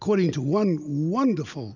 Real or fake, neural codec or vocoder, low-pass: real; none; 7.2 kHz